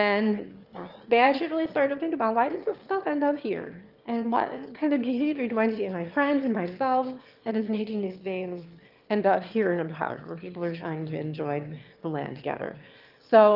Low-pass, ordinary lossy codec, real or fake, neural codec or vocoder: 5.4 kHz; Opus, 24 kbps; fake; autoencoder, 22.05 kHz, a latent of 192 numbers a frame, VITS, trained on one speaker